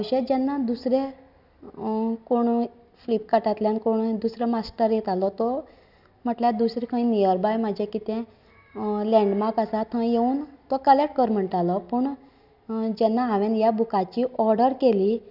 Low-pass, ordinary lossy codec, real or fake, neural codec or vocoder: 5.4 kHz; none; real; none